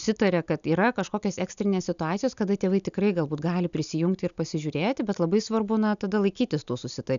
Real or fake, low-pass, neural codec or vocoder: real; 7.2 kHz; none